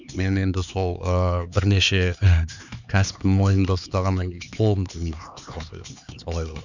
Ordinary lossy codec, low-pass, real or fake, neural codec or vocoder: none; 7.2 kHz; fake; codec, 16 kHz, 4 kbps, X-Codec, HuBERT features, trained on LibriSpeech